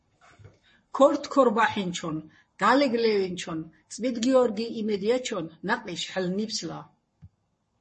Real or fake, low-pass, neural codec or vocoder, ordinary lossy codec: fake; 10.8 kHz; codec, 44.1 kHz, 7.8 kbps, Pupu-Codec; MP3, 32 kbps